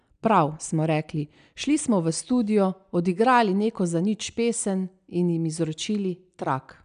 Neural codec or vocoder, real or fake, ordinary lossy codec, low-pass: none; real; none; 9.9 kHz